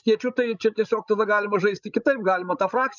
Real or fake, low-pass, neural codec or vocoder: fake; 7.2 kHz; codec, 16 kHz, 16 kbps, FreqCodec, larger model